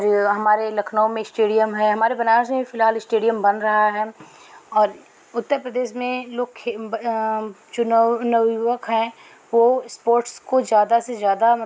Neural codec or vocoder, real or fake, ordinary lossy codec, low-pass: none; real; none; none